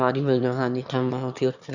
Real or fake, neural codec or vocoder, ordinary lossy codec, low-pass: fake; autoencoder, 22.05 kHz, a latent of 192 numbers a frame, VITS, trained on one speaker; none; 7.2 kHz